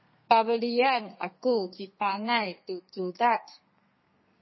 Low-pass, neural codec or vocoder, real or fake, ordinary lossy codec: 7.2 kHz; codec, 32 kHz, 1.9 kbps, SNAC; fake; MP3, 24 kbps